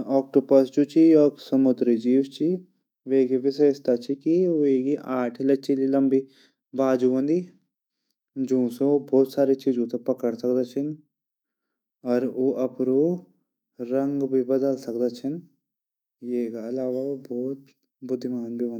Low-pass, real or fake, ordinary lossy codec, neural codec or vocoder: 19.8 kHz; fake; none; autoencoder, 48 kHz, 128 numbers a frame, DAC-VAE, trained on Japanese speech